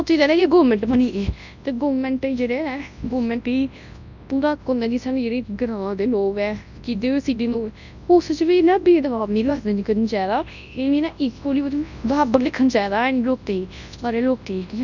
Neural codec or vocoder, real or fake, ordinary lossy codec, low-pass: codec, 24 kHz, 0.9 kbps, WavTokenizer, large speech release; fake; none; 7.2 kHz